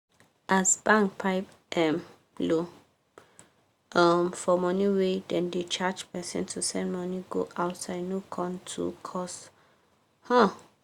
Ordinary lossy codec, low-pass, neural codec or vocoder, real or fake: Opus, 64 kbps; 19.8 kHz; none; real